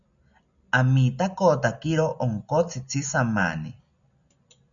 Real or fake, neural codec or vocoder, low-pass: real; none; 7.2 kHz